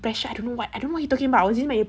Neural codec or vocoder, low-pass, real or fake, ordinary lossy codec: none; none; real; none